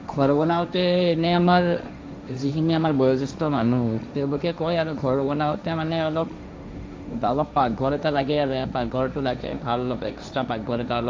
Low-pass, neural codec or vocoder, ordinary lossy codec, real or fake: none; codec, 16 kHz, 1.1 kbps, Voila-Tokenizer; none; fake